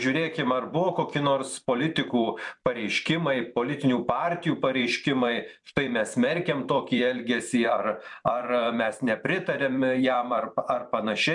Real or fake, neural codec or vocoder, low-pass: fake; vocoder, 44.1 kHz, 128 mel bands every 256 samples, BigVGAN v2; 10.8 kHz